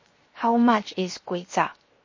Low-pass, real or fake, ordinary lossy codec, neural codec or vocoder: 7.2 kHz; fake; MP3, 32 kbps; codec, 16 kHz, 0.7 kbps, FocalCodec